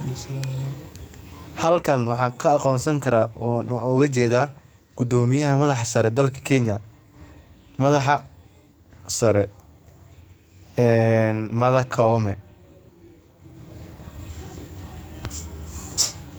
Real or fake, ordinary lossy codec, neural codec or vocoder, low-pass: fake; none; codec, 44.1 kHz, 2.6 kbps, SNAC; none